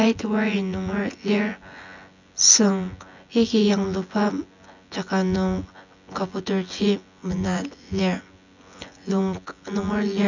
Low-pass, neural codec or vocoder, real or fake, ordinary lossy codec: 7.2 kHz; vocoder, 24 kHz, 100 mel bands, Vocos; fake; none